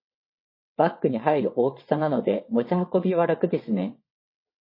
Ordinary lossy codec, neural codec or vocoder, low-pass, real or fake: MP3, 24 kbps; vocoder, 22.05 kHz, 80 mel bands, WaveNeXt; 5.4 kHz; fake